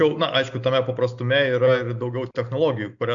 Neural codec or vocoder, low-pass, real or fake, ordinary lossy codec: none; 7.2 kHz; real; AAC, 64 kbps